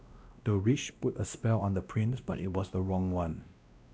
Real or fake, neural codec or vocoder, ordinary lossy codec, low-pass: fake; codec, 16 kHz, 1 kbps, X-Codec, WavLM features, trained on Multilingual LibriSpeech; none; none